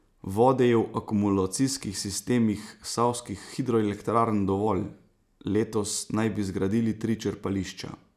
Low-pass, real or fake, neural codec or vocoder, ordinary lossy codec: 14.4 kHz; real; none; none